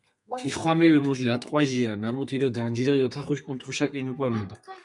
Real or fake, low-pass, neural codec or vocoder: fake; 10.8 kHz; codec, 32 kHz, 1.9 kbps, SNAC